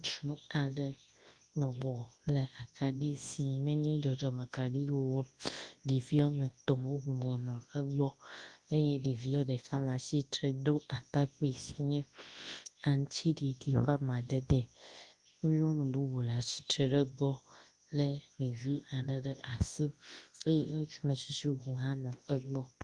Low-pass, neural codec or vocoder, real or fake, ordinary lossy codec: 10.8 kHz; codec, 24 kHz, 0.9 kbps, WavTokenizer, large speech release; fake; Opus, 24 kbps